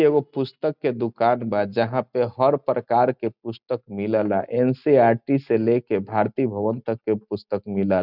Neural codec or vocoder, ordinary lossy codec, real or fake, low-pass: none; none; real; 5.4 kHz